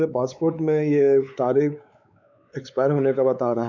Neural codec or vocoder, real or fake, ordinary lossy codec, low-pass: codec, 16 kHz, 4 kbps, X-Codec, WavLM features, trained on Multilingual LibriSpeech; fake; none; 7.2 kHz